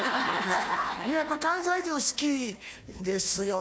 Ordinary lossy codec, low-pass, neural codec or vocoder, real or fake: none; none; codec, 16 kHz, 1 kbps, FunCodec, trained on Chinese and English, 50 frames a second; fake